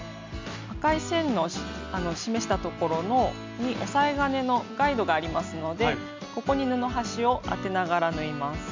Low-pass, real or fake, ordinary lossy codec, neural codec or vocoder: 7.2 kHz; real; MP3, 64 kbps; none